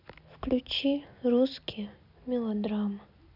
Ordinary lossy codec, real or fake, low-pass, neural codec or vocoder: none; real; 5.4 kHz; none